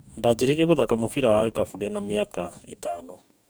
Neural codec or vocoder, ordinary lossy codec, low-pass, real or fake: codec, 44.1 kHz, 2.6 kbps, DAC; none; none; fake